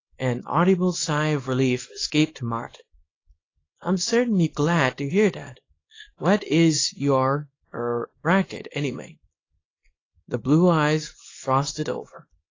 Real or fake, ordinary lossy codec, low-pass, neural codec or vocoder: fake; AAC, 32 kbps; 7.2 kHz; codec, 24 kHz, 0.9 kbps, WavTokenizer, small release